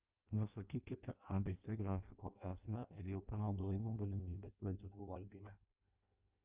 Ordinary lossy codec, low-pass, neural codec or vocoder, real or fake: Opus, 24 kbps; 3.6 kHz; codec, 16 kHz in and 24 kHz out, 0.6 kbps, FireRedTTS-2 codec; fake